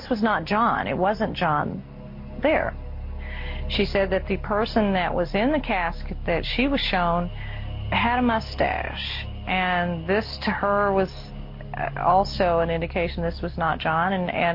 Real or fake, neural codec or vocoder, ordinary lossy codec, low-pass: real; none; MP3, 32 kbps; 5.4 kHz